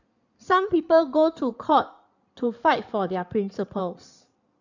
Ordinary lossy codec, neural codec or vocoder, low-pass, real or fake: none; codec, 16 kHz in and 24 kHz out, 2.2 kbps, FireRedTTS-2 codec; 7.2 kHz; fake